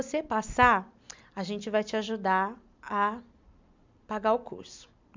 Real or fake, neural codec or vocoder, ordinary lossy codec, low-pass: real; none; MP3, 64 kbps; 7.2 kHz